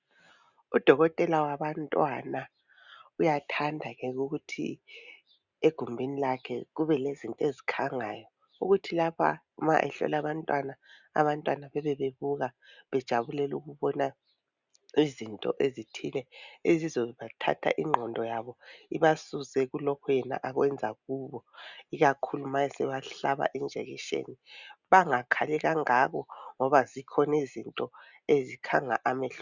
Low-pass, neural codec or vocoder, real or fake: 7.2 kHz; none; real